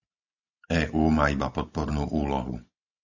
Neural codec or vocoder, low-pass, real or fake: none; 7.2 kHz; real